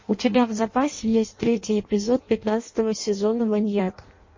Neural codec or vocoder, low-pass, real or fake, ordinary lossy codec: codec, 16 kHz in and 24 kHz out, 0.6 kbps, FireRedTTS-2 codec; 7.2 kHz; fake; MP3, 32 kbps